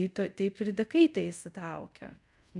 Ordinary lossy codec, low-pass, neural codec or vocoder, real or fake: MP3, 96 kbps; 10.8 kHz; codec, 24 kHz, 0.5 kbps, DualCodec; fake